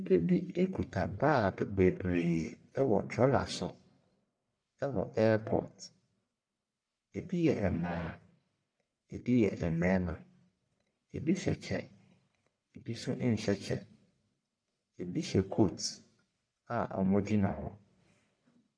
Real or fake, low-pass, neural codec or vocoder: fake; 9.9 kHz; codec, 44.1 kHz, 1.7 kbps, Pupu-Codec